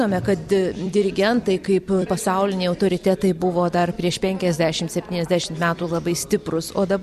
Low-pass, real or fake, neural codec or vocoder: 14.4 kHz; fake; vocoder, 44.1 kHz, 128 mel bands every 256 samples, BigVGAN v2